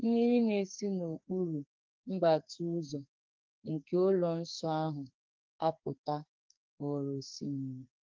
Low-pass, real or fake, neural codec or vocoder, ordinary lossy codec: 7.2 kHz; fake; codec, 44.1 kHz, 2.6 kbps, SNAC; Opus, 24 kbps